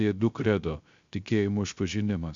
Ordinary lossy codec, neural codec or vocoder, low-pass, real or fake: Opus, 64 kbps; codec, 16 kHz, 0.3 kbps, FocalCodec; 7.2 kHz; fake